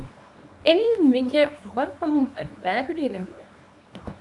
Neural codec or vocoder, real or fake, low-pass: codec, 24 kHz, 0.9 kbps, WavTokenizer, small release; fake; 10.8 kHz